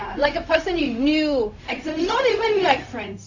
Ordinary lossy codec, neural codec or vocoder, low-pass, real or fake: AAC, 48 kbps; codec, 16 kHz, 0.4 kbps, LongCat-Audio-Codec; 7.2 kHz; fake